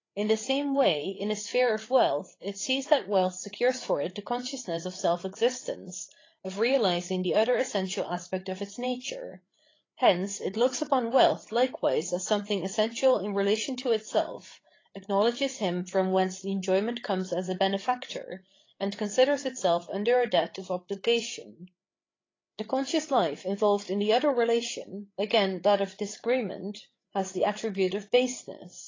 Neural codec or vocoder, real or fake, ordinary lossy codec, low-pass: codec, 16 kHz, 8 kbps, FreqCodec, larger model; fake; AAC, 32 kbps; 7.2 kHz